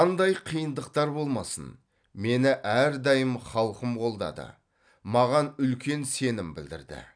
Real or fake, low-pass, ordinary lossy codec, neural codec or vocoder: real; 9.9 kHz; none; none